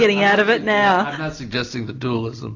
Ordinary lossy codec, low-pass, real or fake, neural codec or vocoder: AAC, 48 kbps; 7.2 kHz; real; none